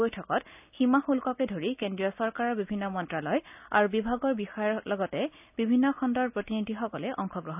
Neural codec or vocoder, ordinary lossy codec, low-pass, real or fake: none; none; 3.6 kHz; real